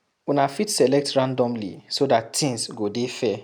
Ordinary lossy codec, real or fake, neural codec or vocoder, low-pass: none; real; none; none